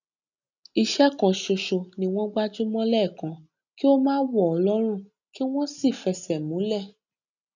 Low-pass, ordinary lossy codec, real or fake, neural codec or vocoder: 7.2 kHz; none; real; none